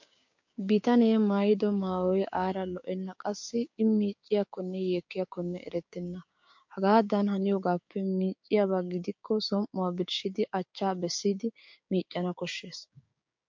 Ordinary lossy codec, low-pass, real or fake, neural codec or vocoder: MP3, 48 kbps; 7.2 kHz; fake; codec, 16 kHz, 6 kbps, DAC